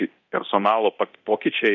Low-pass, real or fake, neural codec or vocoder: 7.2 kHz; fake; codec, 24 kHz, 0.9 kbps, DualCodec